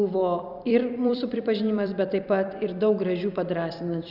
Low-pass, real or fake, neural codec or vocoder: 5.4 kHz; fake; vocoder, 44.1 kHz, 128 mel bands every 256 samples, BigVGAN v2